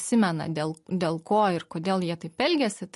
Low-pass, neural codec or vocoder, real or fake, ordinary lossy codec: 14.4 kHz; none; real; MP3, 48 kbps